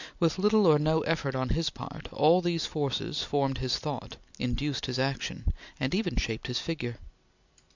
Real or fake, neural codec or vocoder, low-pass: real; none; 7.2 kHz